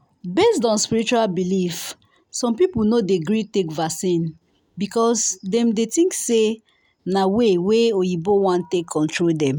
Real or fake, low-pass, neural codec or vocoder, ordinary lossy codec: real; none; none; none